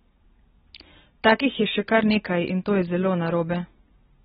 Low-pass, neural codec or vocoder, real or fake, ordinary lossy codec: 14.4 kHz; none; real; AAC, 16 kbps